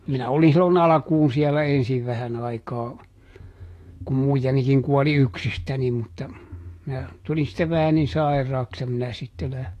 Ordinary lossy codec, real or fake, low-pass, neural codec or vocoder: AAC, 48 kbps; fake; 14.4 kHz; autoencoder, 48 kHz, 128 numbers a frame, DAC-VAE, trained on Japanese speech